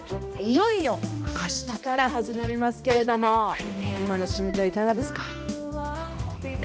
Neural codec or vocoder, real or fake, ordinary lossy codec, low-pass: codec, 16 kHz, 1 kbps, X-Codec, HuBERT features, trained on balanced general audio; fake; none; none